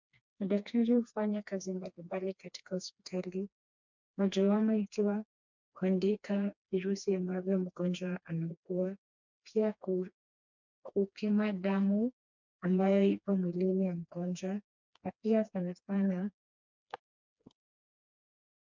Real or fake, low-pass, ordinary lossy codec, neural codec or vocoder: fake; 7.2 kHz; MP3, 64 kbps; codec, 16 kHz, 2 kbps, FreqCodec, smaller model